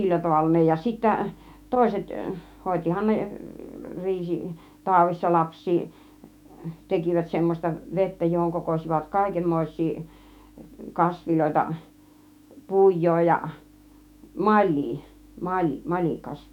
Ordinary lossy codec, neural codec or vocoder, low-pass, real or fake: none; autoencoder, 48 kHz, 128 numbers a frame, DAC-VAE, trained on Japanese speech; 19.8 kHz; fake